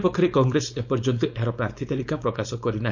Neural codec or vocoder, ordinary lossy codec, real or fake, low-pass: codec, 16 kHz, 4.8 kbps, FACodec; none; fake; 7.2 kHz